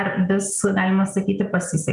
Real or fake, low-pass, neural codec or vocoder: real; 10.8 kHz; none